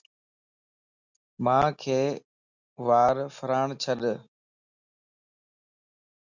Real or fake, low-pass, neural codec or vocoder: real; 7.2 kHz; none